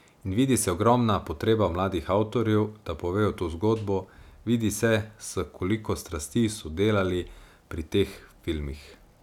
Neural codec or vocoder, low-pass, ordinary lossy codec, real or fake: none; 19.8 kHz; none; real